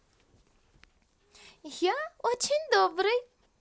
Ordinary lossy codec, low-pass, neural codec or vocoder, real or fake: none; none; none; real